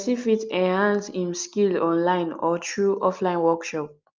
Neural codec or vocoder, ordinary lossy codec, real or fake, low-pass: none; Opus, 24 kbps; real; 7.2 kHz